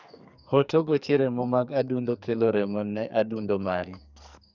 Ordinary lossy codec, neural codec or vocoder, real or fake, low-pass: none; codec, 44.1 kHz, 2.6 kbps, SNAC; fake; 7.2 kHz